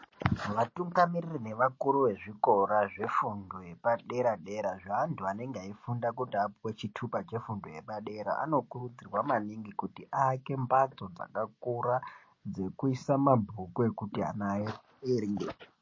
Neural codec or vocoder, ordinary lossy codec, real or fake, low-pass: none; MP3, 32 kbps; real; 7.2 kHz